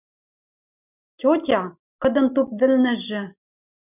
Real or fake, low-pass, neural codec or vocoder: real; 3.6 kHz; none